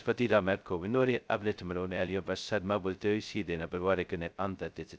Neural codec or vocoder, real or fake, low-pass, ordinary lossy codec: codec, 16 kHz, 0.2 kbps, FocalCodec; fake; none; none